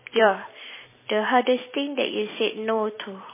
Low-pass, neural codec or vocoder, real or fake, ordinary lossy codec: 3.6 kHz; vocoder, 44.1 kHz, 128 mel bands every 256 samples, BigVGAN v2; fake; MP3, 16 kbps